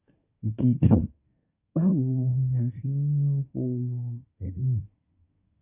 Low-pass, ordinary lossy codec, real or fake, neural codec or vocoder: 3.6 kHz; AAC, 32 kbps; fake; codec, 24 kHz, 1 kbps, SNAC